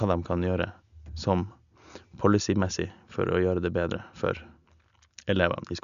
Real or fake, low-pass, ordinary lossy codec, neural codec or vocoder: real; 7.2 kHz; none; none